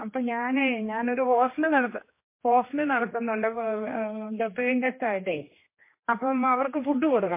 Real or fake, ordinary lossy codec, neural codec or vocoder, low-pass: fake; MP3, 24 kbps; codec, 16 kHz, 2 kbps, X-Codec, HuBERT features, trained on general audio; 3.6 kHz